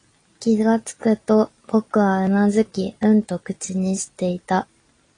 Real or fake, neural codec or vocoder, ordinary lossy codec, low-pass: real; none; AAC, 32 kbps; 9.9 kHz